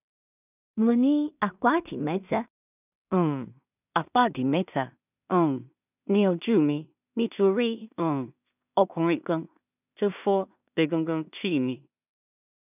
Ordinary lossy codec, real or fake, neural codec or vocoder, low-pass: none; fake; codec, 16 kHz in and 24 kHz out, 0.4 kbps, LongCat-Audio-Codec, two codebook decoder; 3.6 kHz